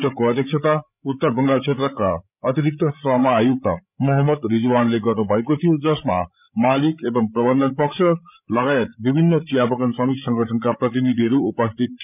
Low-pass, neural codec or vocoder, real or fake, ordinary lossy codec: 3.6 kHz; codec, 16 kHz, 8 kbps, FreqCodec, larger model; fake; none